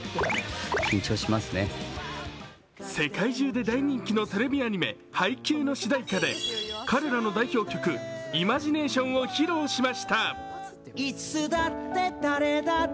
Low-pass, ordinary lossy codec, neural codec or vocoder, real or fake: none; none; none; real